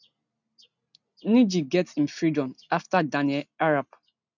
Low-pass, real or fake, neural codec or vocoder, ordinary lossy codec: 7.2 kHz; real; none; none